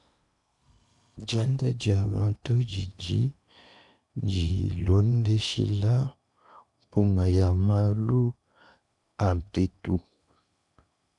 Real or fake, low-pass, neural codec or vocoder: fake; 10.8 kHz; codec, 16 kHz in and 24 kHz out, 0.8 kbps, FocalCodec, streaming, 65536 codes